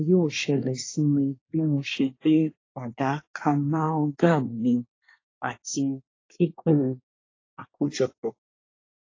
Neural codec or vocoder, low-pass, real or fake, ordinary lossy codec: codec, 24 kHz, 1 kbps, SNAC; 7.2 kHz; fake; AAC, 32 kbps